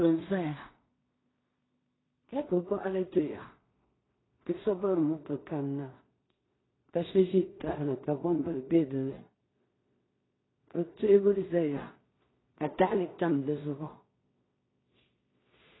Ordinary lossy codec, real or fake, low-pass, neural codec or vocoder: AAC, 16 kbps; fake; 7.2 kHz; codec, 16 kHz in and 24 kHz out, 0.4 kbps, LongCat-Audio-Codec, two codebook decoder